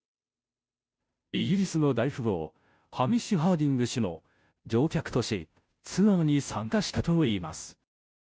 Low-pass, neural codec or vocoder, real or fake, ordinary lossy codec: none; codec, 16 kHz, 0.5 kbps, FunCodec, trained on Chinese and English, 25 frames a second; fake; none